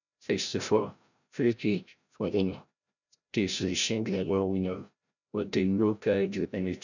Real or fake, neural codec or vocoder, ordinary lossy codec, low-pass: fake; codec, 16 kHz, 0.5 kbps, FreqCodec, larger model; none; 7.2 kHz